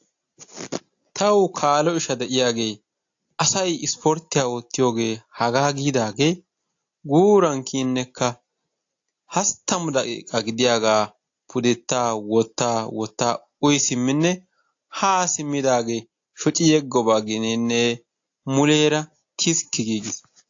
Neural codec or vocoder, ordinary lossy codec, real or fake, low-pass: none; AAC, 48 kbps; real; 7.2 kHz